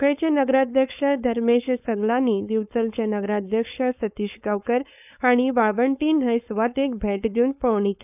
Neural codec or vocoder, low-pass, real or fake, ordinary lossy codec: codec, 16 kHz, 4.8 kbps, FACodec; 3.6 kHz; fake; none